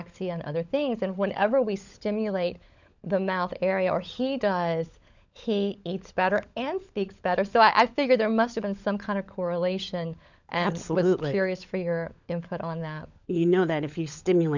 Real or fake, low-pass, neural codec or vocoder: fake; 7.2 kHz; codec, 16 kHz, 16 kbps, FunCodec, trained on LibriTTS, 50 frames a second